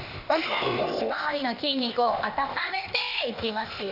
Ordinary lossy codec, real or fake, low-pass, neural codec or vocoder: none; fake; 5.4 kHz; codec, 16 kHz, 0.8 kbps, ZipCodec